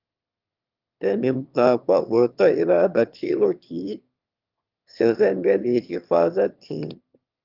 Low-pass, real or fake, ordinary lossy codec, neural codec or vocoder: 5.4 kHz; fake; Opus, 24 kbps; autoencoder, 22.05 kHz, a latent of 192 numbers a frame, VITS, trained on one speaker